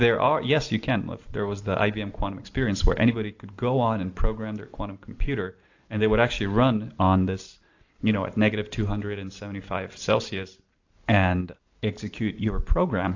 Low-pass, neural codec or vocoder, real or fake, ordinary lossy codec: 7.2 kHz; none; real; AAC, 48 kbps